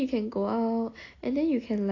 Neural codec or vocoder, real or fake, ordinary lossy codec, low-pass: none; real; AAC, 32 kbps; 7.2 kHz